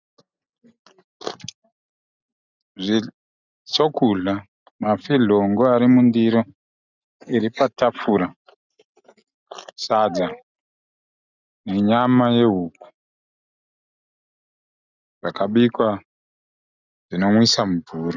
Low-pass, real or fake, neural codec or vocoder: 7.2 kHz; real; none